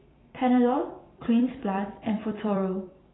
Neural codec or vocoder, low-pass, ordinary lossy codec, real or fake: vocoder, 44.1 kHz, 128 mel bands every 256 samples, BigVGAN v2; 7.2 kHz; AAC, 16 kbps; fake